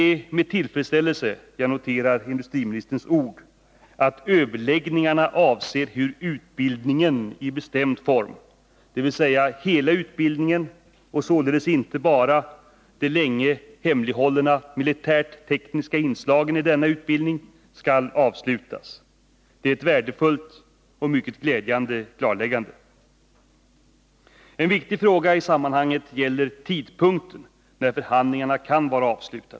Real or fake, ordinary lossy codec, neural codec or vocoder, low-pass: real; none; none; none